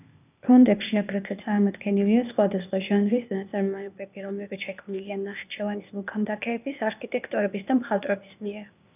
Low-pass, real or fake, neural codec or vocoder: 3.6 kHz; fake; codec, 16 kHz, 0.8 kbps, ZipCodec